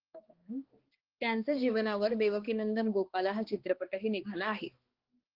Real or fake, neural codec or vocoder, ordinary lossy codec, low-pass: fake; codec, 16 kHz, 2 kbps, X-Codec, HuBERT features, trained on balanced general audio; Opus, 16 kbps; 5.4 kHz